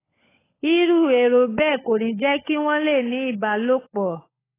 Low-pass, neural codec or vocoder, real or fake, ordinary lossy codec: 3.6 kHz; codec, 16 kHz, 16 kbps, FunCodec, trained on LibriTTS, 50 frames a second; fake; AAC, 16 kbps